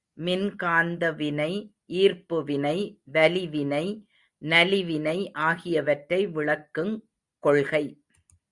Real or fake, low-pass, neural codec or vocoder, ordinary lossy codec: real; 10.8 kHz; none; Opus, 64 kbps